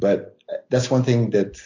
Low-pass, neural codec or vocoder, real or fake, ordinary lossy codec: 7.2 kHz; none; real; AAC, 48 kbps